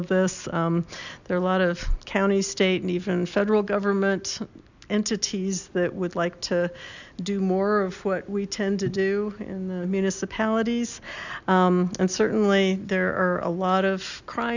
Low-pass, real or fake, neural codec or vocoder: 7.2 kHz; real; none